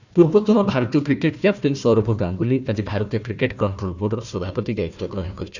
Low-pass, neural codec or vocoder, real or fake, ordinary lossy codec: 7.2 kHz; codec, 16 kHz, 1 kbps, FunCodec, trained on Chinese and English, 50 frames a second; fake; none